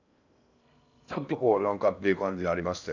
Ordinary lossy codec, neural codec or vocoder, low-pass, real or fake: none; codec, 16 kHz in and 24 kHz out, 0.6 kbps, FocalCodec, streaming, 2048 codes; 7.2 kHz; fake